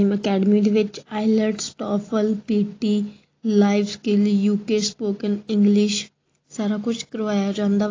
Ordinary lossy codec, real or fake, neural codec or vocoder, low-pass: AAC, 32 kbps; real; none; 7.2 kHz